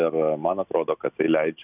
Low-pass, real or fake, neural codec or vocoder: 3.6 kHz; real; none